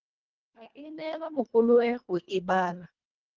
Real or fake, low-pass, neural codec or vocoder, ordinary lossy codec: fake; 7.2 kHz; codec, 24 kHz, 1.5 kbps, HILCodec; Opus, 32 kbps